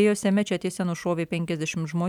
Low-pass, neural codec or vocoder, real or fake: 19.8 kHz; none; real